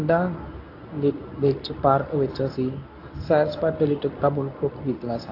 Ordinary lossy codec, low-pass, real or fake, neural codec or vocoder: AAC, 32 kbps; 5.4 kHz; fake; codec, 24 kHz, 0.9 kbps, WavTokenizer, medium speech release version 1